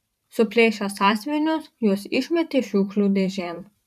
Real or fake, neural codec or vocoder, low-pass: fake; vocoder, 44.1 kHz, 128 mel bands every 512 samples, BigVGAN v2; 14.4 kHz